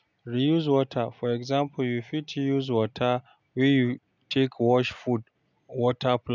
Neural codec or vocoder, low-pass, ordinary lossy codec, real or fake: none; 7.2 kHz; none; real